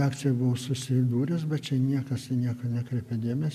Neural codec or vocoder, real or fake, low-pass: none; real; 14.4 kHz